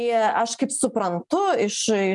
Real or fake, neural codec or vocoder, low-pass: real; none; 10.8 kHz